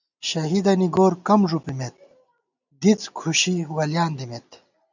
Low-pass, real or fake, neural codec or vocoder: 7.2 kHz; real; none